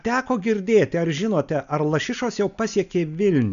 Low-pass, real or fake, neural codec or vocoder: 7.2 kHz; real; none